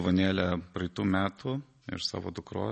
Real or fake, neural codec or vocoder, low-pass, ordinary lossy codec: real; none; 10.8 kHz; MP3, 32 kbps